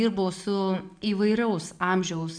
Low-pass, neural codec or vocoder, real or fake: 9.9 kHz; none; real